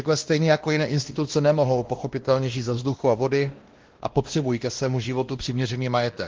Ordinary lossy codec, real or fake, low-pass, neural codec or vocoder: Opus, 16 kbps; fake; 7.2 kHz; codec, 16 kHz, 1 kbps, X-Codec, WavLM features, trained on Multilingual LibriSpeech